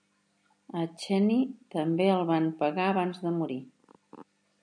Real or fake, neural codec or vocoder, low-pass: real; none; 9.9 kHz